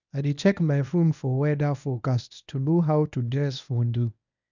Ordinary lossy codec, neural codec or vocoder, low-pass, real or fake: none; codec, 24 kHz, 0.9 kbps, WavTokenizer, medium speech release version 1; 7.2 kHz; fake